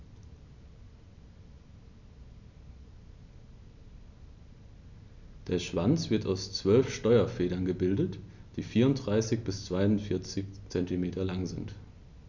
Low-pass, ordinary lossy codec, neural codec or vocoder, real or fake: 7.2 kHz; none; none; real